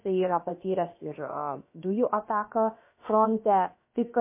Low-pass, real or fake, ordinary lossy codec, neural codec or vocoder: 3.6 kHz; fake; MP3, 24 kbps; codec, 16 kHz, about 1 kbps, DyCAST, with the encoder's durations